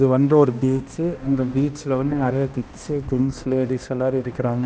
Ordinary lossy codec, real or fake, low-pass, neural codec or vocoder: none; fake; none; codec, 16 kHz, 1 kbps, X-Codec, HuBERT features, trained on balanced general audio